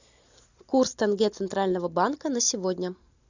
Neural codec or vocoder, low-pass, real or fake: none; 7.2 kHz; real